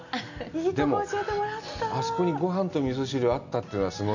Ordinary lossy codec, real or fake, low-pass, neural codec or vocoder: none; real; 7.2 kHz; none